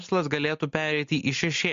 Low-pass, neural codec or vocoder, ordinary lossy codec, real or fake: 7.2 kHz; none; MP3, 48 kbps; real